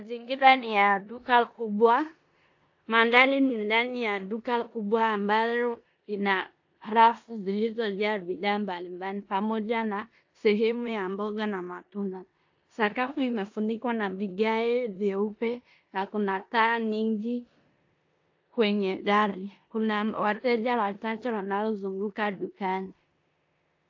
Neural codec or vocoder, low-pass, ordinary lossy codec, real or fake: codec, 16 kHz in and 24 kHz out, 0.9 kbps, LongCat-Audio-Codec, four codebook decoder; 7.2 kHz; AAC, 48 kbps; fake